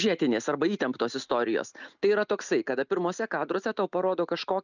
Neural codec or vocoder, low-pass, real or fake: none; 7.2 kHz; real